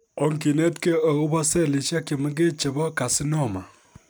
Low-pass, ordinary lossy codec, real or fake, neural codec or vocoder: none; none; real; none